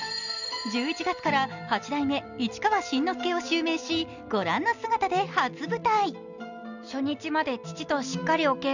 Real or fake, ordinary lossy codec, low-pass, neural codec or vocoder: real; none; 7.2 kHz; none